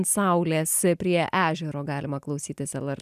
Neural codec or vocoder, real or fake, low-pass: none; real; 14.4 kHz